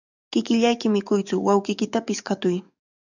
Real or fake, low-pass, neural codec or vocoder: fake; 7.2 kHz; codec, 44.1 kHz, 7.8 kbps, DAC